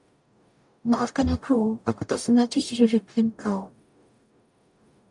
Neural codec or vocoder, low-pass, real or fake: codec, 44.1 kHz, 0.9 kbps, DAC; 10.8 kHz; fake